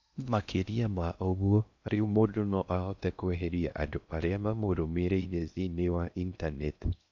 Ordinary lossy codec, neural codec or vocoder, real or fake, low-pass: none; codec, 16 kHz in and 24 kHz out, 0.6 kbps, FocalCodec, streaming, 2048 codes; fake; 7.2 kHz